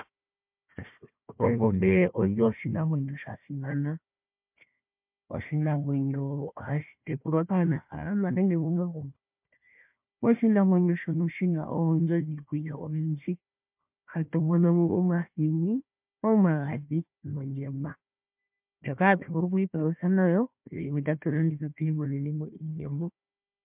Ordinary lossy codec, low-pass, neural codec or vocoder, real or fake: MP3, 32 kbps; 3.6 kHz; codec, 16 kHz, 1 kbps, FunCodec, trained on Chinese and English, 50 frames a second; fake